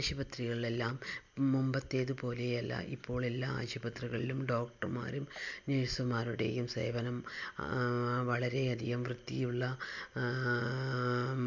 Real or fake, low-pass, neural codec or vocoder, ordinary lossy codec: real; 7.2 kHz; none; MP3, 64 kbps